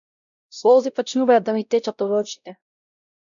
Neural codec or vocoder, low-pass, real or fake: codec, 16 kHz, 0.5 kbps, X-Codec, WavLM features, trained on Multilingual LibriSpeech; 7.2 kHz; fake